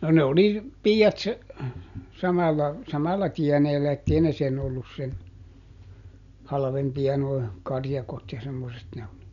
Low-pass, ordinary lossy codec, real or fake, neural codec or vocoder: 7.2 kHz; none; real; none